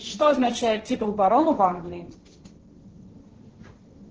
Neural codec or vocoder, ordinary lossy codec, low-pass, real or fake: codec, 16 kHz, 1.1 kbps, Voila-Tokenizer; Opus, 16 kbps; 7.2 kHz; fake